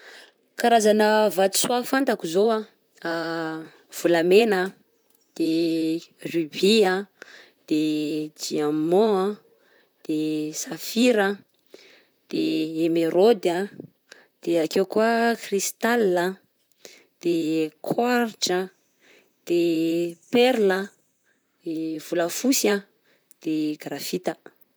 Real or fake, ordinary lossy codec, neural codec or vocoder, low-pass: fake; none; vocoder, 44.1 kHz, 128 mel bands, Pupu-Vocoder; none